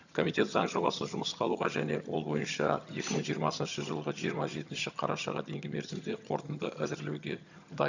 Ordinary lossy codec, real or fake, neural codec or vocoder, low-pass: none; fake; vocoder, 22.05 kHz, 80 mel bands, HiFi-GAN; 7.2 kHz